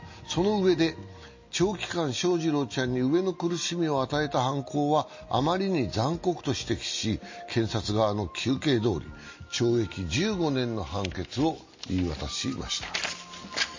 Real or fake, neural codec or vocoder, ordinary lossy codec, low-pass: real; none; MP3, 32 kbps; 7.2 kHz